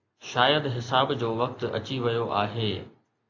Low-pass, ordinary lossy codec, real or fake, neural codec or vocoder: 7.2 kHz; AAC, 48 kbps; real; none